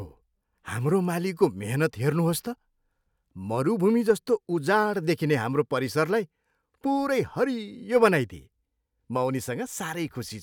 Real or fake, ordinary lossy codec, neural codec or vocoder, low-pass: real; none; none; 19.8 kHz